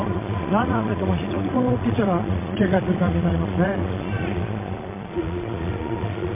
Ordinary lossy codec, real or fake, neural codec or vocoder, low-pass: MP3, 24 kbps; fake; vocoder, 22.05 kHz, 80 mel bands, Vocos; 3.6 kHz